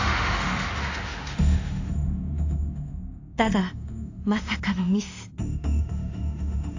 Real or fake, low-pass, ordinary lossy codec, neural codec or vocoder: fake; 7.2 kHz; AAC, 48 kbps; autoencoder, 48 kHz, 32 numbers a frame, DAC-VAE, trained on Japanese speech